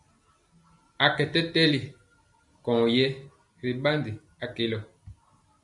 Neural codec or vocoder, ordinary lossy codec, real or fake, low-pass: none; MP3, 96 kbps; real; 10.8 kHz